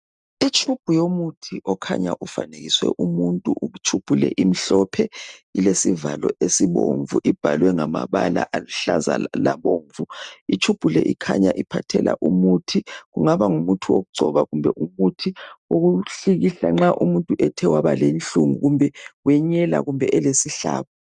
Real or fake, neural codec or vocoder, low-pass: real; none; 10.8 kHz